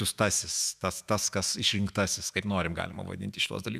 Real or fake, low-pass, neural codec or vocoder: fake; 14.4 kHz; autoencoder, 48 kHz, 128 numbers a frame, DAC-VAE, trained on Japanese speech